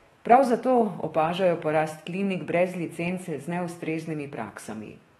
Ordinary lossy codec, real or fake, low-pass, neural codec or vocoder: AAC, 32 kbps; fake; 19.8 kHz; autoencoder, 48 kHz, 128 numbers a frame, DAC-VAE, trained on Japanese speech